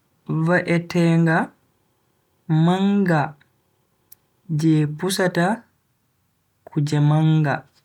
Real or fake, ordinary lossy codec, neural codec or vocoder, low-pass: real; none; none; 19.8 kHz